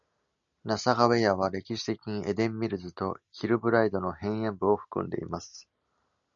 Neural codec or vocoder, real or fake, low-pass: none; real; 7.2 kHz